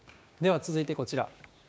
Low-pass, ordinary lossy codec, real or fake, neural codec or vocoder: none; none; fake; codec, 16 kHz, 6 kbps, DAC